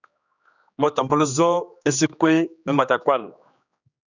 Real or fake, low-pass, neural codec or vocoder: fake; 7.2 kHz; codec, 16 kHz, 2 kbps, X-Codec, HuBERT features, trained on general audio